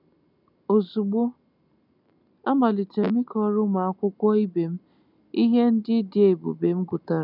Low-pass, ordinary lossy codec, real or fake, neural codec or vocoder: 5.4 kHz; none; real; none